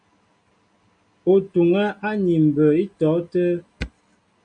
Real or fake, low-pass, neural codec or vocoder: real; 9.9 kHz; none